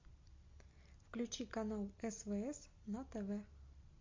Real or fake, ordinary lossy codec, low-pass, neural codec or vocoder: real; MP3, 48 kbps; 7.2 kHz; none